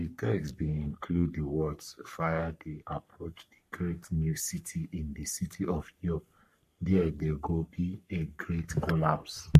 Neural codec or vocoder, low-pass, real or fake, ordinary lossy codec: codec, 44.1 kHz, 3.4 kbps, Pupu-Codec; 14.4 kHz; fake; MP3, 64 kbps